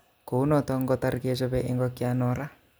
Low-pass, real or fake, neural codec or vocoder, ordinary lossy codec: none; real; none; none